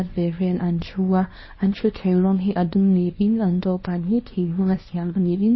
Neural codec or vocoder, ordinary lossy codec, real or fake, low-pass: codec, 24 kHz, 0.9 kbps, WavTokenizer, small release; MP3, 24 kbps; fake; 7.2 kHz